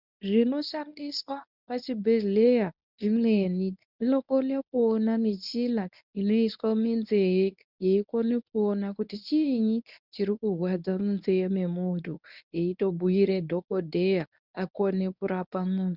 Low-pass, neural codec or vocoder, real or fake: 5.4 kHz; codec, 24 kHz, 0.9 kbps, WavTokenizer, medium speech release version 1; fake